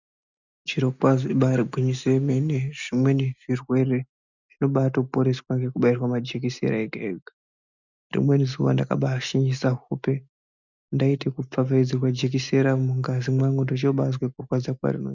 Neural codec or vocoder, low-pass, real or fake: none; 7.2 kHz; real